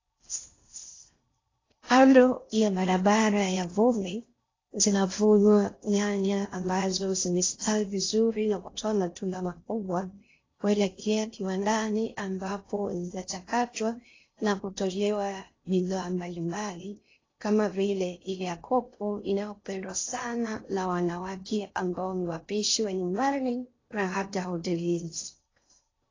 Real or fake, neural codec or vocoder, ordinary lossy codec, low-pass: fake; codec, 16 kHz in and 24 kHz out, 0.6 kbps, FocalCodec, streaming, 4096 codes; AAC, 32 kbps; 7.2 kHz